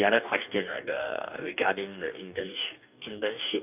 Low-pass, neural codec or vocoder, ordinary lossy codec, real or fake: 3.6 kHz; codec, 44.1 kHz, 2.6 kbps, DAC; none; fake